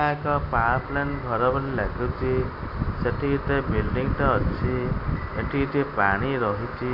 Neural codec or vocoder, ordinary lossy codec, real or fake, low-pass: none; AAC, 48 kbps; real; 5.4 kHz